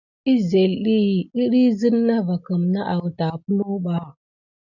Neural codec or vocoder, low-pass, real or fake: none; 7.2 kHz; real